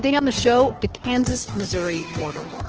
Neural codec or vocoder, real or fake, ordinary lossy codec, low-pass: codec, 16 kHz, 2 kbps, FunCodec, trained on Chinese and English, 25 frames a second; fake; Opus, 16 kbps; 7.2 kHz